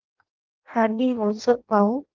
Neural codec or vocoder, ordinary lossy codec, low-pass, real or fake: codec, 16 kHz in and 24 kHz out, 0.6 kbps, FireRedTTS-2 codec; Opus, 32 kbps; 7.2 kHz; fake